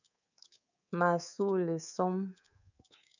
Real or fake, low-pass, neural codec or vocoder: fake; 7.2 kHz; codec, 24 kHz, 3.1 kbps, DualCodec